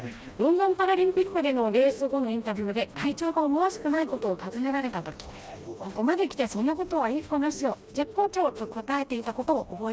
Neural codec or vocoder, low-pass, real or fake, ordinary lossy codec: codec, 16 kHz, 1 kbps, FreqCodec, smaller model; none; fake; none